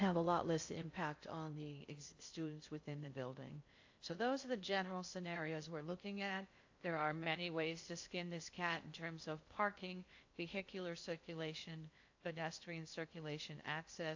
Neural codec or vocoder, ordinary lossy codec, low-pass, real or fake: codec, 16 kHz in and 24 kHz out, 0.6 kbps, FocalCodec, streaming, 2048 codes; AAC, 48 kbps; 7.2 kHz; fake